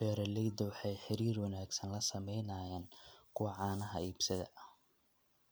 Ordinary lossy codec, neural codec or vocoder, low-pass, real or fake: none; none; none; real